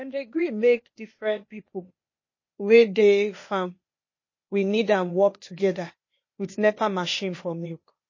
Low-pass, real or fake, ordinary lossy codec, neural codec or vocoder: 7.2 kHz; fake; MP3, 32 kbps; codec, 16 kHz, 0.8 kbps, ZipCodec